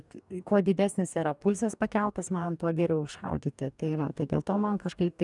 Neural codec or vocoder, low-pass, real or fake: codec, 44.1 kHz, 2.6 kbps, DAC; 10.8 kHz; fake